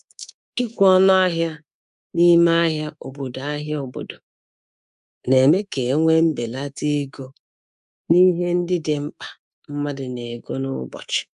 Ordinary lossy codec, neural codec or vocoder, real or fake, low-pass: none; codec, 24 kHz, 3.1 kbps, DualCodec; fake; 10.8 kHz